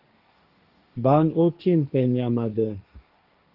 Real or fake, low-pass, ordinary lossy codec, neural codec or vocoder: fake; 5.4 kHz; Opus, 24 kbps; codec, 16 kHz, 1.1 kbps, Voila-Tokenizer